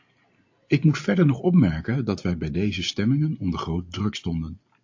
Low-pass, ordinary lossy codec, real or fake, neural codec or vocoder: 7.2 kHz; AAC, 48 kbps; real; none